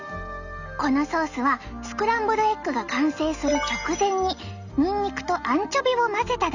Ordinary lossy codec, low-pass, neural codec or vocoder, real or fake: none; 7.2 kHz; none; real